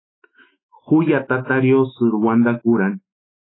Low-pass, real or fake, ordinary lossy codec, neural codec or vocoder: 7.2 kHz; fake; AAC, 16 kbps; autoencoder, 48 kHz, 128 numbers a frame, DAC-VAE, trained on Japanese speech